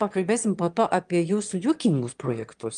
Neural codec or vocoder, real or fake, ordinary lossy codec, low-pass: autoencoder, 22.05 kHz, a latent of 192 numbers a frame, VITS, trained on one speaker; fake; Opus, 32 kbps; 9.9 kHz